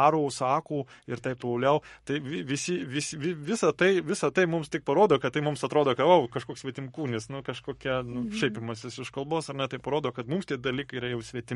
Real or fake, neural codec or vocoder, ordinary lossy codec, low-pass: fake; codec, 44.1 kHz, 7.8 kbps, Pupu-Codec; MP3, 48 kbps; 19.8 kHz